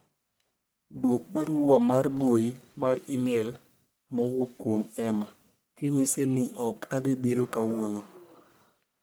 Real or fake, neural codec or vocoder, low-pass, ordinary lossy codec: fake; codec, 44.1 kHz, 1.7 kbps, Pupu-Codec; none; none